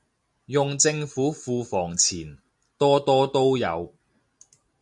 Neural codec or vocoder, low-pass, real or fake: none; 10.8 kHz; real